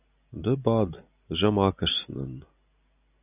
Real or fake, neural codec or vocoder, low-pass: real; none; 3.6 kHz